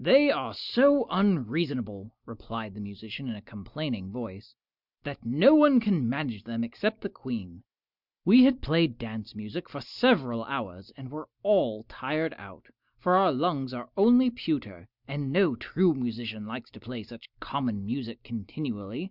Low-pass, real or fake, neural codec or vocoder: 5.4 kHz; real; none